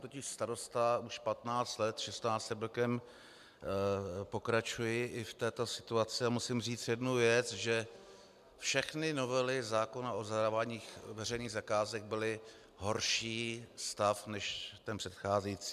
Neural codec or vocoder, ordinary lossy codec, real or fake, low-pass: none; MP3, 96 kbps; real; 14.4 kHz